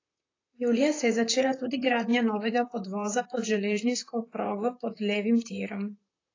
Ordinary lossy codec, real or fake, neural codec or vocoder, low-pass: AAC, 32 kbps; fake; vocoder, 44.1 kHz, 128 mel bands, Pupu-Vocoder; 7.2 kHz